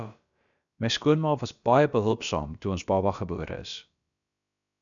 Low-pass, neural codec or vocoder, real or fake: 7.2 kHz; codec, 16 kHz, about 1 kbps, DyCAST, with the encoder's durations; fake